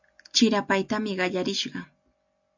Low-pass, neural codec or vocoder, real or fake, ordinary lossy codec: 7.2 kHz; none; real; MP3, 64 kbps